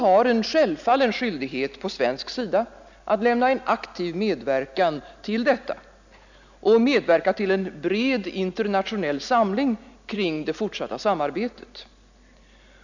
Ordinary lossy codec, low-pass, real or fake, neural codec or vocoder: none; 7.2 kHz; real; none